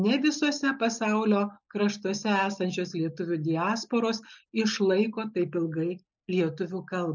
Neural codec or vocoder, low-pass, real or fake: none; 7.2 kHz; real